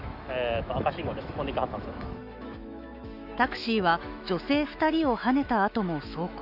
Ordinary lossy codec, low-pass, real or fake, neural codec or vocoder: none; 5.4 kHz; fake; autoencoder, 48 kHz, 128 numbers a frame, DAC-VAE, trained on Japanese speech